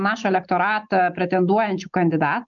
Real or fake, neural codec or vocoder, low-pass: real; none; 7.2 kHz